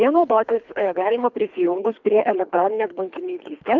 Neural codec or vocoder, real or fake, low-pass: codec, 24 kHz, 3 kbps, HILCodec; fake; 7.2 kHz